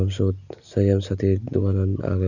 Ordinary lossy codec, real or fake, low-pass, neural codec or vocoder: none; real; 7.2 kHz; none